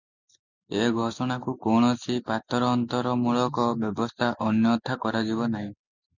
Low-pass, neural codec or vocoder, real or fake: 7.2 kHz; none; real